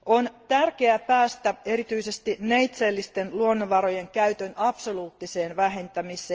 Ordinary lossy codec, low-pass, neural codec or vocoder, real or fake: Opus, 24 kbps; 7.2 kHz; none; real